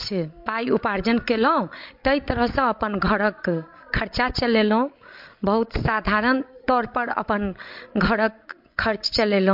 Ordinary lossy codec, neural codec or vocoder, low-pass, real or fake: MP3, 48 kbps; codec, 16 kHz, 16 kbps, FreqCodec, larger model; 5.4 kHz; fake